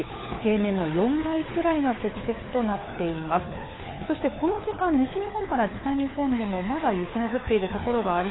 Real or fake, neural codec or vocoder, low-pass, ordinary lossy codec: fake; codec, 16 kHz, 4 kbps, FunCodec, trained on LibriTTS, 50 frames a second; 7.2 kHz; AAC, 16 kbps